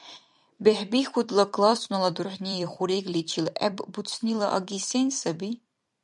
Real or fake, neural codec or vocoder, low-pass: fake; vocoder, 44.1 kHz, 128 mel bands every 256 samples, BigVGAN v2; 10.8 kHz